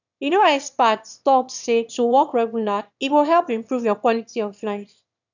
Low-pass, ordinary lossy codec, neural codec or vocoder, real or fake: 7.2 kHz; none; autoencoder, 22.05 kHz, a latent of 192 numbers a frame, VITS, trained on one speaker; fake